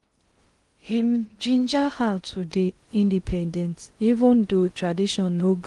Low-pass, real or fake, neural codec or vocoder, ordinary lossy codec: 10.8 kHz; fake; codec, 16 kHz in and 24 kHz out, 0.6 kbps, FocalCodec, streaming, 2048 codes; Opus, 24 kbps